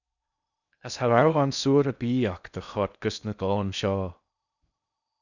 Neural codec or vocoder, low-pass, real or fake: codec, 16 kHz in and 24 kHz out, 0.6 kbps, FocalCodec, streaming, 4096 codes; 7.2 kHz; fake